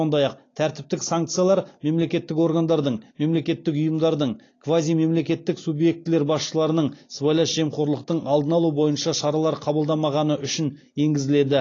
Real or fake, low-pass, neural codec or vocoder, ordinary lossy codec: real; 7.2 kHz; none; AAC, 32 kbps